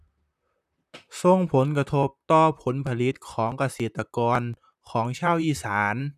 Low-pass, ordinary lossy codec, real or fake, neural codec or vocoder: 14.4 kHz; none; fake; vocoder, 44.1 kHz, 128 mel bands, Pupu-Vocoder